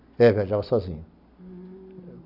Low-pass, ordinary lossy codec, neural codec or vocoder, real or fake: 5.4 kHz; none; none; real